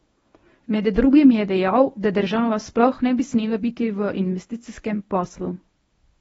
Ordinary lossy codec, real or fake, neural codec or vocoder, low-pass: AAC, 24 kbps; fake; codec, 24 kHz, 0.9 kbps, WavTokenizer, medium speech release version 1; 10.8 kHz